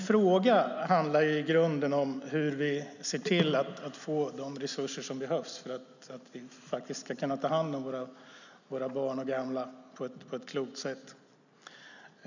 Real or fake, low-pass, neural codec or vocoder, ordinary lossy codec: real; 7.2 kHz; none; none